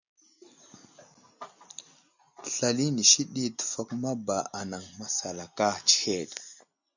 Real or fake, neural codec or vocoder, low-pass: real; none; 7.2 kHz